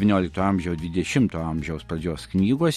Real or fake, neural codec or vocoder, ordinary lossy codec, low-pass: real; none; MP3, 64 kbps; 14.4 kHz